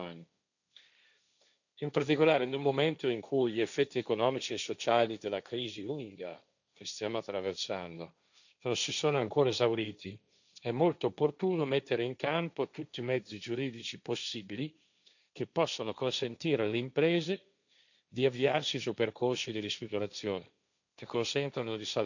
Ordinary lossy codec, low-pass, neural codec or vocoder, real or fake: none; 7.2 kHz; codec, 16 kHz, 1.1 kbps, Voila-Tokenizer; fake